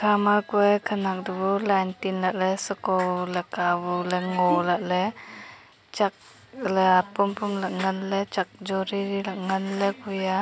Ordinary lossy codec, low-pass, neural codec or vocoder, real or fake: none; none; none; real